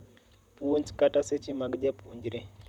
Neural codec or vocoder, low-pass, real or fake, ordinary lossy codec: vocoder, 44.1 kHz, 128 mel bands, Pupu-Vocoder; 19.8 kHz; fake; none